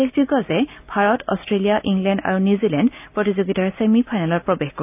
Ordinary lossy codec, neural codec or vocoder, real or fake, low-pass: AAC, 32 kbps; none; real; 3.6 kHz